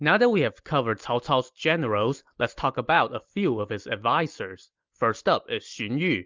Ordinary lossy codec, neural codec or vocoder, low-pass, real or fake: Opus, 32 kbps; none; 7.2 kHz; real